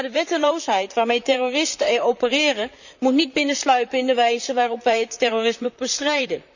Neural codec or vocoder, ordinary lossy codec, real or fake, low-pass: vocoder, 44.1 kHz, 128 mel bands, Pupu-Vocoder; none; fake; 7.2 kHz